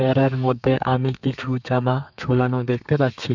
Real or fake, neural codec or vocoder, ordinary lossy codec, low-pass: fake; codec, 32 kHz, 1.9 kbps, SNAC; none; 7.2 kHz